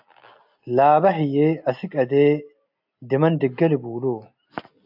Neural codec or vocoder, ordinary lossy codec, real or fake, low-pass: none; AAC, 48 kbps; real; 5.4 kHz